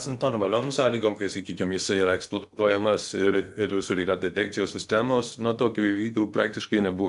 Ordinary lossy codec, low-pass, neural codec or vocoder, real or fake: AAC, 96 kbps; 10.8 kHz; codec, 16 kHz in and 24 kHz out, 0.8 kbps, FocalCodec, streaming, 65536 codes; fake